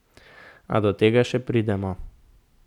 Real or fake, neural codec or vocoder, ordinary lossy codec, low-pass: real; none; none; 19.8 kHz